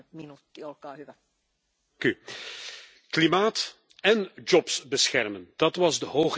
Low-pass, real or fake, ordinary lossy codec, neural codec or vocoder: none; real; none; none